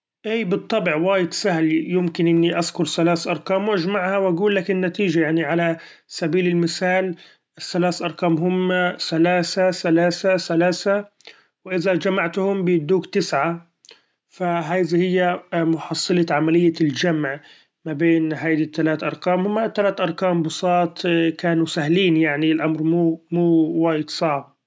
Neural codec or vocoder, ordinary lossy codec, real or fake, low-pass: none; none; real; none